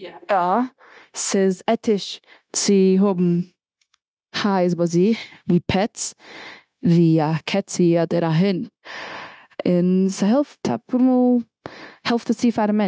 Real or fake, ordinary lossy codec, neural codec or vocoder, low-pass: fake; none; codec, 16 kHz, 0.9 kbps, LongCat-Audio-Codec; none